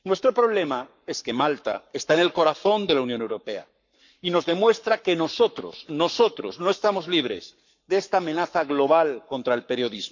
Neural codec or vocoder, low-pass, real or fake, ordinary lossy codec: codec, 44.1 kHz, 7.8 kbps, Pupu-Codec; 7.2 kHz; fake; none